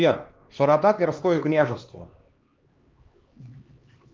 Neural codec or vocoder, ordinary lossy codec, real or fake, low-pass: codec, 16 kHz, 2 kbps, X-Codec, HuBERT features, trained on LibriSpeech; Opus, 24 kbps; fake; 7.2 kHz